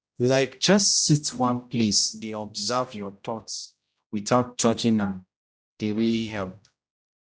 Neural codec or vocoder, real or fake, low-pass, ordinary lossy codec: codec, 16 kHz, 0.5 kbps, X-Codec, HuBERT features, trained on general audio; fake; none; none